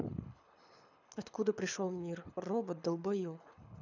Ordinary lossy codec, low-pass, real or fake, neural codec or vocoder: none; 7.2 kHz; fake; codec, 24 kHz, 6 kbps, HILCodec